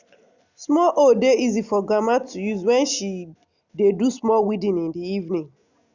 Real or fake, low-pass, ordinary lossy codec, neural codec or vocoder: real; 7.2 kHz; Opus, 64 kbps; none